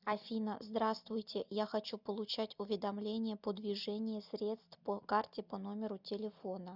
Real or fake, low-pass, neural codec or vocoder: real; 5.4 kHz; none